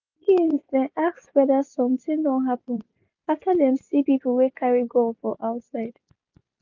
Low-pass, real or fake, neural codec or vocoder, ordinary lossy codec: 7.2 kHz; real; none; none